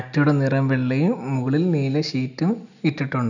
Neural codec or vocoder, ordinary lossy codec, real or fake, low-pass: none; none; real; 7.2 kHz